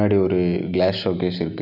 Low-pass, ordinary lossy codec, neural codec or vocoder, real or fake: 5.4 kHz; none; none; real